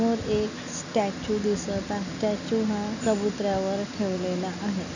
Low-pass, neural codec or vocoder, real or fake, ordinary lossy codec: 7.2 kHz; none; real; none